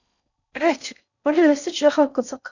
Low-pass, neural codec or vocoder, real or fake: 7.2 kHz; codec, 16 kHz in and 24 kHz out, 0.6 kbps, FocalCodec, streaming, 4096 codes; fake